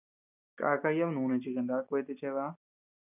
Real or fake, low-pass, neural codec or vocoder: real; 3.6 kHz; none